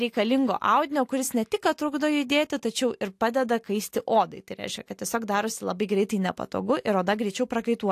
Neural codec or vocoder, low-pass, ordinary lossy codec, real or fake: none; 14.4 kHz; AAC, 64 kbps; real